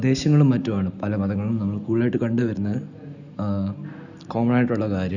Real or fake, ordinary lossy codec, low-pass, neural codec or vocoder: real; none; 7.2 kHz; none